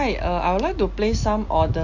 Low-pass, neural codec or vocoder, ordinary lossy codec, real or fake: 7.2 kHz; none; none; real